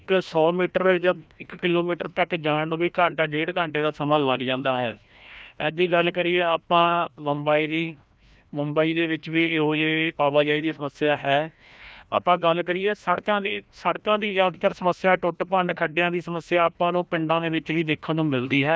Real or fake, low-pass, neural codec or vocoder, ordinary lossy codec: fake; none; codec, 16 kHz, 1 kbps, FreqCodec, larger model; none